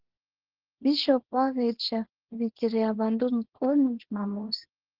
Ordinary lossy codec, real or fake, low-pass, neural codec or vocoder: Opus, 16 kbps; fake; 5.4 kHz; codec, 24 kHz, 0.9 kbps, WavTokenizer, small release